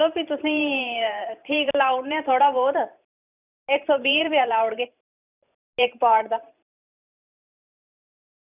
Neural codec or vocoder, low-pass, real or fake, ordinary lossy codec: vocoder, 44.1 kHz, 128 mel bands every 512 samples, BigVGAN v2; 3.6 kHz; fake; none